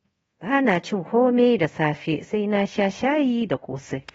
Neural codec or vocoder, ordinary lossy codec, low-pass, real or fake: codec, 24 kHz, 0.5 kbps, DualCodec; AAC, 24 kbps; 10.8 kHz; fake